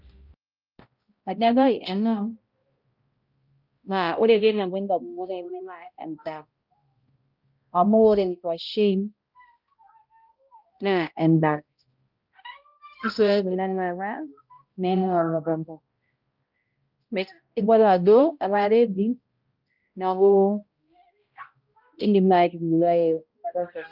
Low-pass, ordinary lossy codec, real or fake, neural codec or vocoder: 5.4 kHz; Opus, 24 kbps; fake; codec, 16 kHz, 0.5 kbps, X-Codec, HuBERT features, trained on balanced general audio